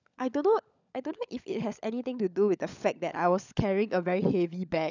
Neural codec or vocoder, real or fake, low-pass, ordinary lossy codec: codec, 16 kHz, 16 kbps, FunCodec, trained on LibriTTS, 50 frames a second; fake; 7.2 kHz; none